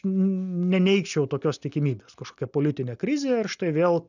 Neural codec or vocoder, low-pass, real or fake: none; 7.2 kHz; real